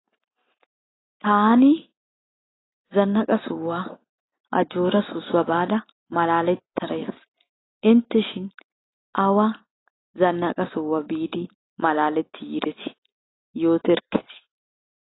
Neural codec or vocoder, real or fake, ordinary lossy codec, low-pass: none; real; AAC, 16 kbps; 7.2 kHz